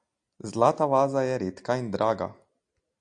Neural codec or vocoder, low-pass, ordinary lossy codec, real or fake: none; 9.9 kHz; AAC, 64 kbps; real